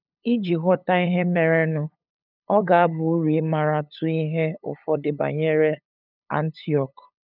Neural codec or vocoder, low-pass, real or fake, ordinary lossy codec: codec, 16 kHz, 8 kbps, FunCodec, trained on LibriTTS, 25 frames a second; 5.4 kHz; fake; none